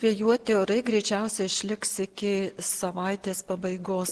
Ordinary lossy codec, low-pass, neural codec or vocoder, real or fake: Opus, 16 kbps; 10.8 kHz; none; real